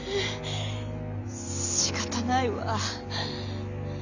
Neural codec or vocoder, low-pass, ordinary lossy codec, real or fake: none; 7.2 kHz; none; real